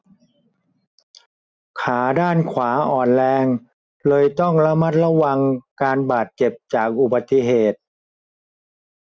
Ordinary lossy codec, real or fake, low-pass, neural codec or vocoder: none; real; none; none